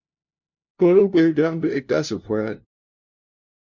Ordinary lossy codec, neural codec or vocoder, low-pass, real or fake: MP3, 48 kbps; codec, 16 kHz, 0.5 kbps, FunCodec, trained on LibriTTS, 25 frames a second; 7.2 kHz; fake